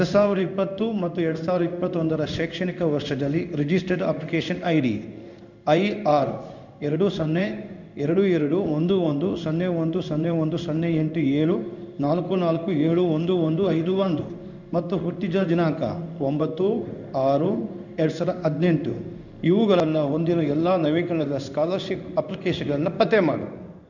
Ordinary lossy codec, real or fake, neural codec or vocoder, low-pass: none; fake; codec, 16 kHz in and 24 kHz out, 1 kbps, XY-Tokenizer; 7.2 kHz